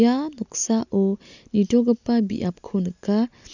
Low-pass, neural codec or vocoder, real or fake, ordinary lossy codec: 7.2 kHz; none; real; none